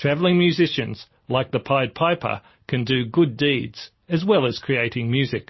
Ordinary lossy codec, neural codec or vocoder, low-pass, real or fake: MP3, 24 kbps; none; 7.2 kHz; real